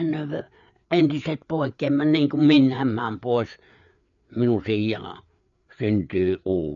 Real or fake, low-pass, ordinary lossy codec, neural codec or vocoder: fake; 7.2 kHz; none; codec, 16 kHz, 4 kbps, FreqCodec, larger model